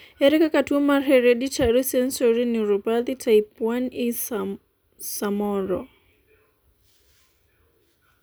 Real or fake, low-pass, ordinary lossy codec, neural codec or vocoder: real; none; none; none